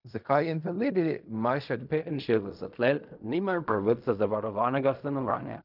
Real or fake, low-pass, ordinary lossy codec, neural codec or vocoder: fake; 5.4 kHz; none; codec, 16 kHz in and 24 kHz out, 0.4 kbps, LongCat-Audio-Codec, fine tuned four codebook decoder